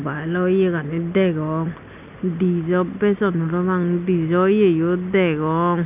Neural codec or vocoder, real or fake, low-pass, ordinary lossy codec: none; real; 3.6 kHz; none